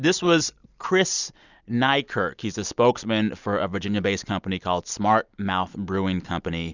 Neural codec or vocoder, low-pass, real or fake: none; 7.2 kHz; real